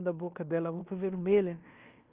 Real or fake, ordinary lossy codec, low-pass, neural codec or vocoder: fake; Opus, 32 kbps; 3.6 kHz; codec, 16 kHz in and 24 kHz out, 0.9 kbps, LongCat-Audio-Codec, fine tuned four codebook decoder